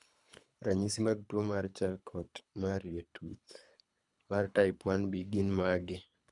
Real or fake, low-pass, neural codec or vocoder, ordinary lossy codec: fake; 10.8 kHz; codec, 24 kHz, 3 kbps, HILCodec; none